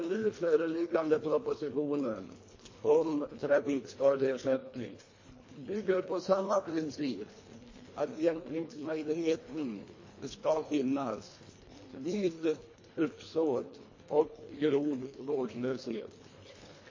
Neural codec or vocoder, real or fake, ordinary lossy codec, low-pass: codec, 24 kHz, 1.5 kbps, HILCodec; fake; MP3, 32 kbps; 7.2 kHz